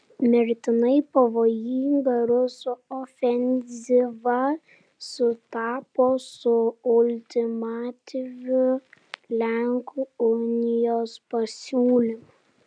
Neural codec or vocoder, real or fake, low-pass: none; real; 9.9 kHz